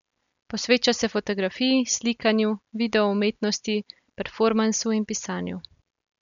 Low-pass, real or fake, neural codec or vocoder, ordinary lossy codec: 7.2 kHz; real; none; MP3, 96 kbps